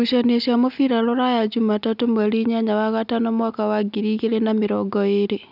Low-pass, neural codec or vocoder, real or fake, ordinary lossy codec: 5.4 kHz; none; real; none